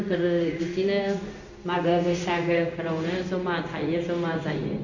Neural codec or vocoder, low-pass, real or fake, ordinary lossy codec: codec, 16 kHz in and 24 kHz out, 1 kbps, XY-Tokenizer; 7.2 kHz; fake; none